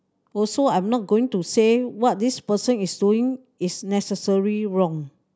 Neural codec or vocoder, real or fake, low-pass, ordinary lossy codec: none; real; none; none